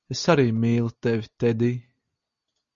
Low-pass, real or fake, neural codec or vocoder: 7.2 kHz; real; none